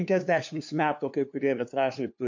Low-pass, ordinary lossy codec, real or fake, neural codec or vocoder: 7.2 kHz; MP3, 48 kbps; fake; codec, 16 kHz, 2 kbps, FunCodec, trained on LibriTTS, 25 frames a second